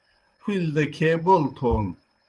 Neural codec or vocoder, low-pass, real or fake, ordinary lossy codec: vocoder, 24 kHz, 100 mel bands, Vocos; 10.8 kHz; fake; Opus, 24 kbps